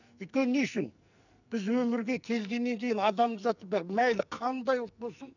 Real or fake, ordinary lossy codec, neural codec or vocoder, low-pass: fake; none; codec, 44.1 kHz, 2.6 kbps, SNAC; 7.2 kHz